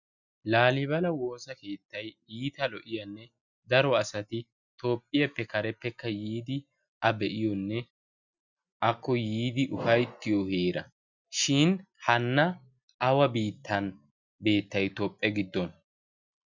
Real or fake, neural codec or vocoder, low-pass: real; none; 7.2 kHz